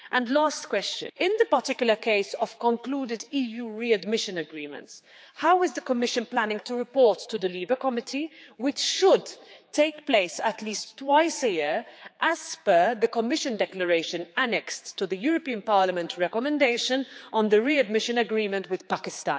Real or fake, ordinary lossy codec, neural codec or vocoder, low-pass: fake; none; codec, 16 kHz, 4 kbps, X-Codec, HuBERT features, trained on general audio; none